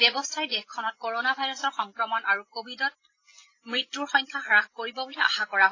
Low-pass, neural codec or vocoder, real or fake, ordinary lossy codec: 7.2 kHz; none; real; AAC, 32 kbps